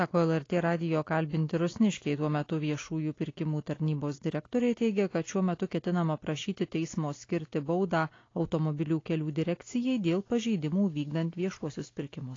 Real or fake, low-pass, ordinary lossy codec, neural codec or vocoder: real; 7.2 kHz; AAC, 32 kbps; none